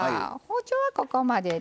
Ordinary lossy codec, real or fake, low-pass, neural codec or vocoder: none; real; none; none